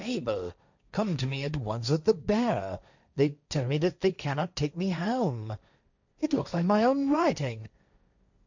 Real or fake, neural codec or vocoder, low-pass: fake; codec, 16 kHz, 1.1 kbps, Voila-Tokenizer; 7.2 kHz